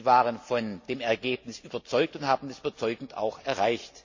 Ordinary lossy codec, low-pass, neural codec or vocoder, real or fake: MP3, 64 kbps; 7.2 kHz; none; real